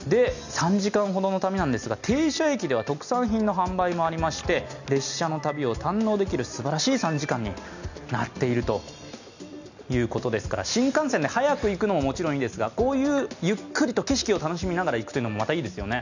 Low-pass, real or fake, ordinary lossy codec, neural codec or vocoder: 7.2 kHz; real; none; none